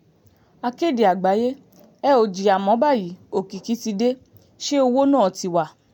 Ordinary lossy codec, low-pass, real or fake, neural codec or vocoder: none; 19.8 kHz; real; none